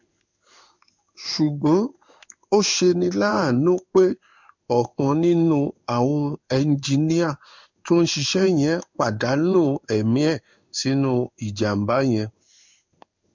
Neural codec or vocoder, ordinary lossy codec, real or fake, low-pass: codec, 16 kHz in and 24 kHz out, 1 kbps, XY-Tokenizer; MP3, 64 kbps; fake; 7.2 kHz